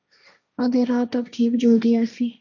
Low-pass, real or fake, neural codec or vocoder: 7.2 kHz; fake; codec, 16 kHz, 1.1 kbps, Voila-Tokenizer